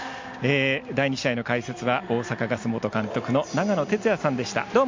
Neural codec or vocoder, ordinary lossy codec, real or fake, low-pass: none; MP3, 48 kbps; real; 7.2 kHz